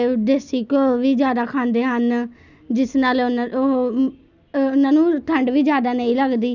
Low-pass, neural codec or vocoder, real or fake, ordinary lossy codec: 7.2 kHz; none; real; none